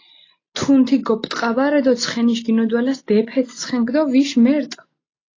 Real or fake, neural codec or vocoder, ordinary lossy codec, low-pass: real; none; AAC, 32 kbps; 7.2 kHz